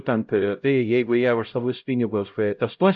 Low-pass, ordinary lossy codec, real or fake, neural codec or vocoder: 7.2 kHz; AAC, 64 kbps; fake; codec, 16 kHz, 0.5 kbps, X-Codec, HuBERT features, trained on LibriSpeech